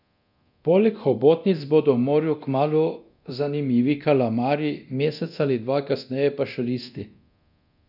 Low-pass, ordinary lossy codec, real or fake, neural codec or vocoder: 5.4 kHz; none; fake; codec, 24 kHz, 0.9 kbps, DualCodec